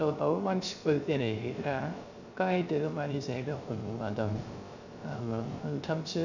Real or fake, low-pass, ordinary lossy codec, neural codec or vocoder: fake; 7.2 kHz; none; codec, 16 kHz, 0.3 kbps, FocalCodec